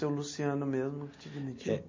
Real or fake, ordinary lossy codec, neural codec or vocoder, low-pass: real; MP3, 32 kbps; none; 7.2 kHz